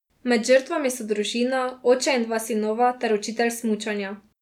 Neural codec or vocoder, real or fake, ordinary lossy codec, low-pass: none; real; none; 19.8 kHz